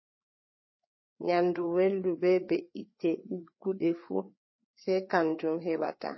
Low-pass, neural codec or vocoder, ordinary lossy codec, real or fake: 7.2 kHz; codec, 16 kHz, 4 kbps, FreqCodec, larger model; MP3, 24 kbps; fake